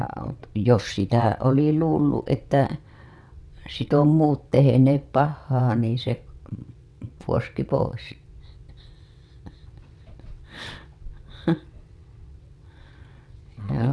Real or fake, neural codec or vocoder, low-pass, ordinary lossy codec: fake; vocoder, 22.05 kHz, 80 mel bands, WaveNeXt; none; none